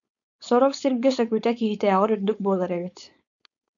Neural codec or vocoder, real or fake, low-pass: codec, 16 kHz, 4.8 kbps, FACodec; fake; 7.2 kHz